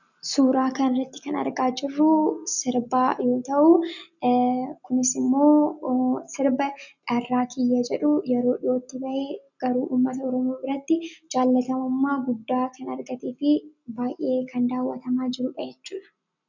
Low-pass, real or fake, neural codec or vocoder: 7.2 kHz; real; none